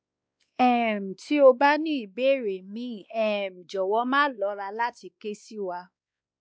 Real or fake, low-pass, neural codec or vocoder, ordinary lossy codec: fake; none; codec, 16 kHz, 2 kbps, X-Codec, WavLM features, trained on Multilingual LibriSpeech; none